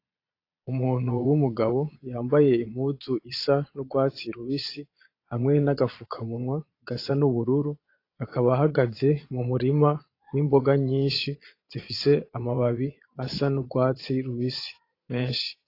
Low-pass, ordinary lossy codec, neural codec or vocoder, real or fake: 5.4 kHz; AAC, 32 kbps; vocoder, 22.05 kHz, 80 mel bands, Vocos; fake